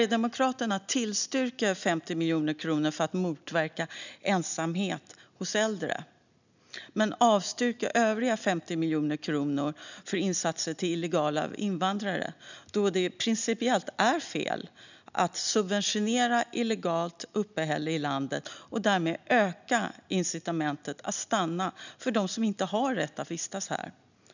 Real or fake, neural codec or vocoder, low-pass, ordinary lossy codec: real; none; 7.2 kHz; none